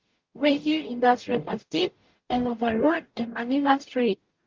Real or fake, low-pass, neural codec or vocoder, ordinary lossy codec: fake; 7.2 kHz; codec, 44.1 kHz, 0.9 kbps, DAC; Opus, 32 kbps